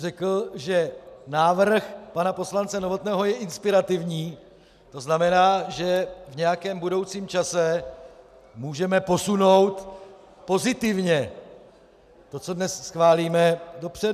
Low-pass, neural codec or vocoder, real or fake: 14.4 kHz; none; real